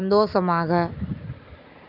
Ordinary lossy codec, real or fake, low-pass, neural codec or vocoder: none; real; 5.4 kHz; none